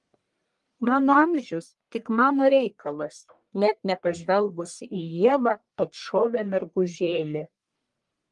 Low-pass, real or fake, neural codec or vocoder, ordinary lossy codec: 10.8 kHz; fake; codec, 44.1 kHz, 1.7 kbps, Pupu-Codec; Opus, 32 kbps